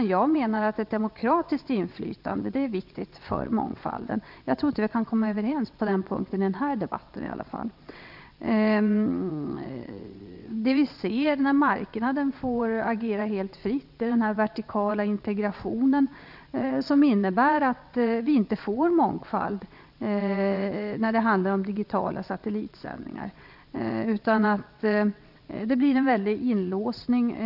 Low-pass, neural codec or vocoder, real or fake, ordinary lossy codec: 5.4 kHz; vocoder, 22.05 kHz, 80 mel bands, WaveNeXt; fake; none